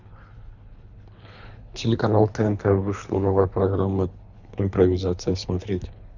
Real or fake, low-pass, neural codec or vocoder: fake; 7.2 kHz; codec, 24 kHz, 3 kbps, HILCodec